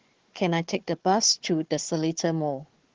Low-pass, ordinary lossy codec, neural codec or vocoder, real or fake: 7.2 kHz; Opus, 16 kbps; codec, 16 kHz, 4 kbps, FunCodec, trained on Chinese and English, 50 frames a second; fake